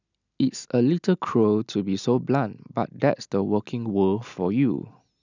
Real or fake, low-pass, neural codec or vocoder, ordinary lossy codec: real; 7.2 kHz; none; none